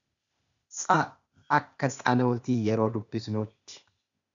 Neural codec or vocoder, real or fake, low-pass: codec, 16 kHz, 0.8 kbps, ZipCodec; fake; 7.2 kHz